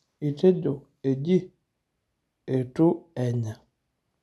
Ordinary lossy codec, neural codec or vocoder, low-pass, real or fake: none; none; none; real